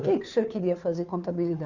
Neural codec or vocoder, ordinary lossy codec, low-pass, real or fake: codec, 16 kHz, 2 kbps, FunCodec, trained on Chinese and English, 25 frames a second; none; 7.2 kHz; fake